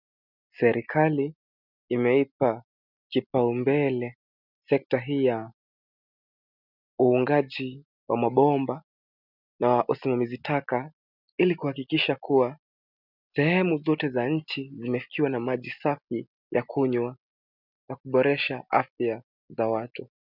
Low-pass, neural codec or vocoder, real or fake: 5.4 kHz; none; real